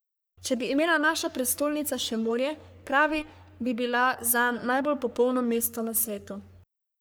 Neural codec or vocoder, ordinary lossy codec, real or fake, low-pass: codec, 44.1 kHz, 3.4 kbps, Pupu-Codec; none; fake; none